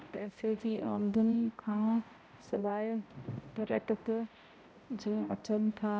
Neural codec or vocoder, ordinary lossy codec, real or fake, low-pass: codec, 16 kHz, 0.5 kbps, X-Codec, HuBERT features, trained on balanced general audio; none; fake; none